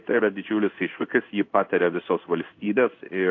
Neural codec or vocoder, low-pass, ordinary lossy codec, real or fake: codec, 16 kHz in and 24 kHz out, 1 kbps, XY-Tokenizer; 7.2 kHz; AAC, 48 kbps; fake